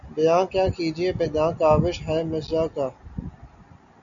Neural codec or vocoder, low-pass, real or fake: none; 7.2 kHz; real